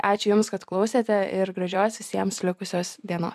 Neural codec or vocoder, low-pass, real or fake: vocoder, 44.1 kHz, 128 mel bands every 256 samples, BigVGAN v2; 14.4 kHz; fake